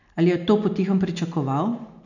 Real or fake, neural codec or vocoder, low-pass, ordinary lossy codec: real; none; 7.2 kHz; none